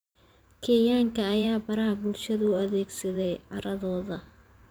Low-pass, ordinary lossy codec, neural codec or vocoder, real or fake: none; none; vocoder, 44.1 kHz, 128 mel bands every 512 samples, BigVGAN v2; fake